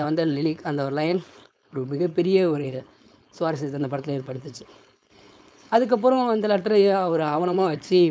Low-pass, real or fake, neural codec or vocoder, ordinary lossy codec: none; fake; codec, 16 kHz, 4.8 kbps, FACodec; none